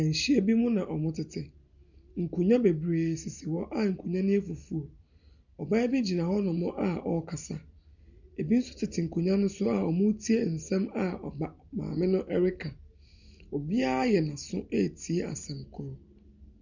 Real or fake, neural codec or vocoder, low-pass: real; none; 7.2 kHz